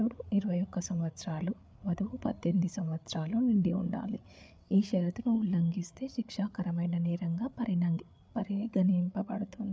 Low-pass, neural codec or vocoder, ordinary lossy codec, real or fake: none; codec, 16 kHz, 8 kbps, FreqCodec, larger model; none; fake